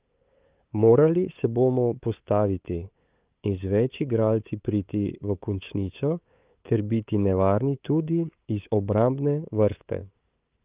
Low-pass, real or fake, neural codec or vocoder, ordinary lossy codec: 3.6 kHz; fake; codec, 16 kHz, 8 kbps, FunCodec, trained on LibriTTS, 25 frames a second; Opus, 24 kbps